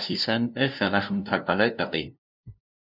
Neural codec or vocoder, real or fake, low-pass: codec, 16 kHz, 0.5 kbps, FunCodec, trained on LibriTTS, 25 frames a second; fake; 5.4 kHz